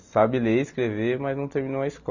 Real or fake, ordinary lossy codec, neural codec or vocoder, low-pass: real; none; none; 7.2 kHz